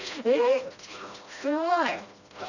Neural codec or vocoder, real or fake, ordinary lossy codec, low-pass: codec, 16 kHz, 1 kbps, FreqCodec, smaller model; fake; none; 7.2 kHz